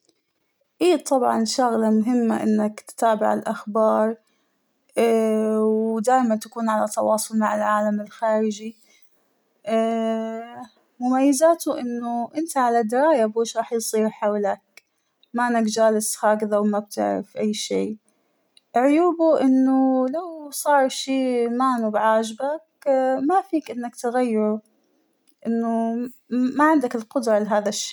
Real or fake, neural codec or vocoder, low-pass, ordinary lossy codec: real; none; none; none